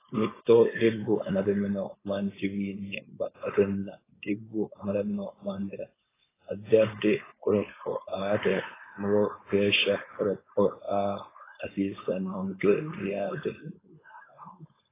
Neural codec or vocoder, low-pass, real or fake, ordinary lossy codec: codec, 16 kHz, 4.8 kbps, FACodec; 3.6 kHz; fake; AAC, 16 kbps